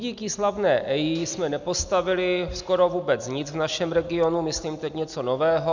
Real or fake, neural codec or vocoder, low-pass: real; none; 7.2 kHz